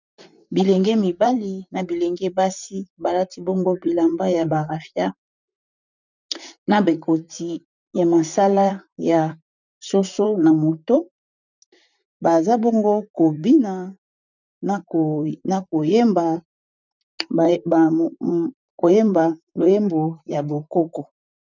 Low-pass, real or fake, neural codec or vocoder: 7.2 kHz; fake; vocoder, 44.1 kHz, 128 mel bands, Pupu-Vocoder